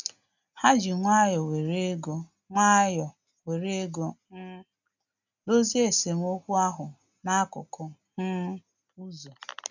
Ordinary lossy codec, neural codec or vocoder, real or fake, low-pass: none; none; real; 7.2 kHz